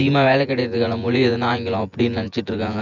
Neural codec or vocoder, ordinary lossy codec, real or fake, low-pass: vocoder, 24 kHz, 100 mel bands, Vocos; none; fake; 7.2 kHz